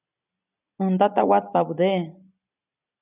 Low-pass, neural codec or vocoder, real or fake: 3.6 kHz; none; real